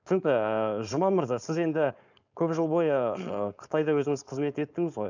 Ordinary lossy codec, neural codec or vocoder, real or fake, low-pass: none; codec, 16 kHz, 4.8 kbps, FACodec; fake; 7.2 kHz